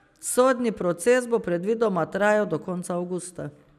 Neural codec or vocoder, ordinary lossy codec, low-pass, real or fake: none; none; 14.4 kHz; real